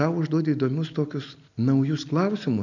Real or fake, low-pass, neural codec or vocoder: real; 7.2 kHz; none